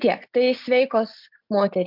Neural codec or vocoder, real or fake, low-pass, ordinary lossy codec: none; real; 5.4 kHz; MP3, 48 kbps